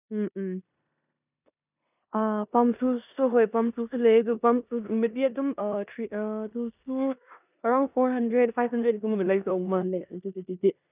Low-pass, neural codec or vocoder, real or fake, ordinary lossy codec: 3.6 kHz; codec, 16 kHz in and 24 kHz out, 0.9 kbps, LongCat-Audio-Codec, four codebook decoder; fake; none